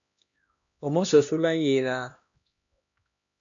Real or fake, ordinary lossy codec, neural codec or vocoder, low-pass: fake; MP3, 64 kbps; codec, 16 kHz, 1 kbps, X-Codec, HuBERT features, trained on LibriSpeech; 7.2 kHz